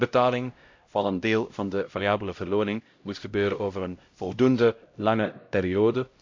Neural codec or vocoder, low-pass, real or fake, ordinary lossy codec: codec, 16 kHz, 0.5 kbps, X-Codec, HuBERT features, trained on LibriSpeech; 7.2 kHz; fake; MP3, 48 kbps